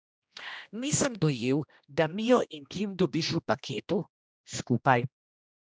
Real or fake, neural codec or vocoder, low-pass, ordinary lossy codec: fake; codec, 16 kHz, 1 kbps, X-Codec, HuBERT features, trained on general audio; none; none